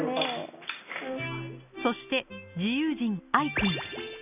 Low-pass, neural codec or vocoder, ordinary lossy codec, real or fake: 3.6 kHz; none; none; real